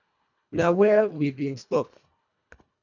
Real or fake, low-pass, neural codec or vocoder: fake; 7.2 kHz; codec, 24 kHz, 1.5 kbps, HILCodec